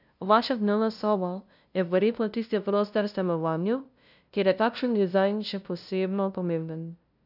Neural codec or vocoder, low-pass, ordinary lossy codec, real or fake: codec, 16 kHz, 0.5 kbps, FunCodec, trained on LibriTTS, 25 frames a second; 5.4 kHz; none; fake